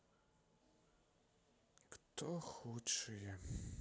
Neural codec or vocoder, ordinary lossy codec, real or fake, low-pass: none; none; real; none